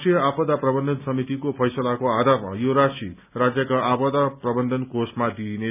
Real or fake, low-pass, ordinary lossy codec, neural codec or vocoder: real; 3.6 kHz; none; none